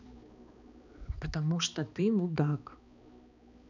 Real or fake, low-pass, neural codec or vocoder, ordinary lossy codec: fake; 7.2 kHz; codec, 16 kHz, 2 kbps, X-Codec, HuBERT features, trained on balanced general audio; none